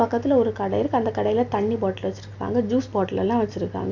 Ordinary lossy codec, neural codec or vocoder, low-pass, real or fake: none; none; 7.2 kHz; real